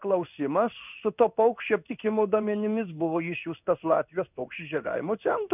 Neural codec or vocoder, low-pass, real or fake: codec, 16 kHz in and 24 kHz out, 1 kbps, XY-Tokenizer; 3.6 kHz; fake